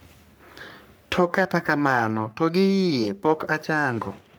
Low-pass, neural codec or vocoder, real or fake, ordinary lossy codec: none; codec, 44.1 kHz, 3.4 kbps, Pupu-Codec; fake; none